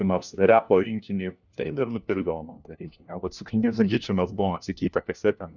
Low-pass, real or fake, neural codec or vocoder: 7.2 kHz; fake; codec, 16 kHz, 1 kbps, FunCodec, trained on LibriTTS, 50 frames a second